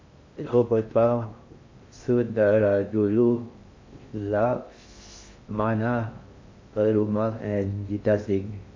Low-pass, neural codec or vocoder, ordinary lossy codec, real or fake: 7.2 kHz; codec, 16 kHz in and 24 kHz out, 0.6 kbps, FocalCodec, streaming, 2048 codes; MP3, 48 kbps; fake